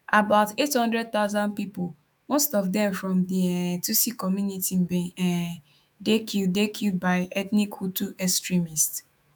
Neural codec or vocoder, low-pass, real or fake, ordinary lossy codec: autoencoder, 48 kHz, 128 numbers a frame, DAC-VAE, trained on Japanese speech; 19.8 kHz; fake; none